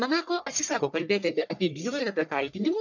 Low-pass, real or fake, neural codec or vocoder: 7.2 kHz; fake; codec, 44.1 kHz, 1.7 kbps, Pupu-Codec